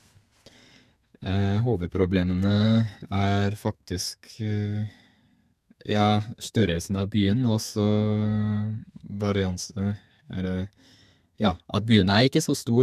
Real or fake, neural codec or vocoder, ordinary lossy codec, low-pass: fake; codec, 32 kHz, 1.9 kbps, SNAC; none; 14.4 kHz